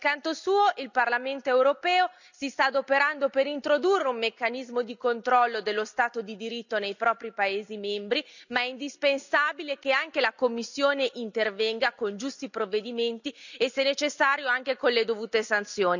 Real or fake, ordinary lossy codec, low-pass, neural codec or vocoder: real; none; 7.2 kHz; none